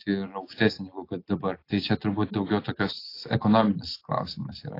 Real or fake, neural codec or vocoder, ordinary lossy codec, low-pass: real; none; AAC, 32 kbps; 5.4 kHz